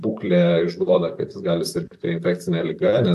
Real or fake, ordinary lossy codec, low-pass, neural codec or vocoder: fake; AAC, 64 kbps; 14.4 kHz; vocoder, 44.1 kHz, 128 mel bands, Pupu-Vocoder